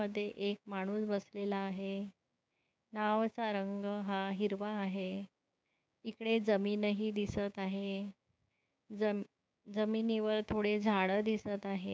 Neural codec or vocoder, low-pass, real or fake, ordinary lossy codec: codec, 16 kHz, 6 kbps, DAC; none; fake; none